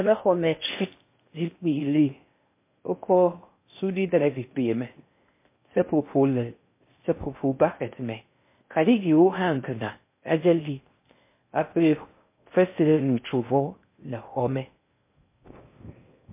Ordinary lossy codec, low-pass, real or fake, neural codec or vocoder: MP3, 24 kbps; 3.6 kHz; fake; codec, 16 kHz in and 24 kHz out, 0.6 kbps, FocalCodec, streaming, 4096 codes